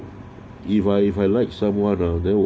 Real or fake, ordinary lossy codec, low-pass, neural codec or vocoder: real; none; none; none